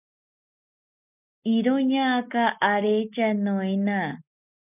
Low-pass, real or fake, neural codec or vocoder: 3.6 kHz; real; none